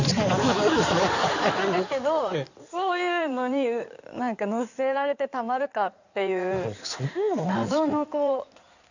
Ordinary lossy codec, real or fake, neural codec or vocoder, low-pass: none; fake; codec, 16 kHz in and 24 kHz out, 2.2 kbps, FireRedTTS-2 codec; 7.2 kHz